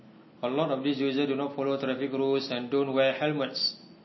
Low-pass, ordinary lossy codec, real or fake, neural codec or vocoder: 7.2 kHz; MP3, 24 kbps; real; none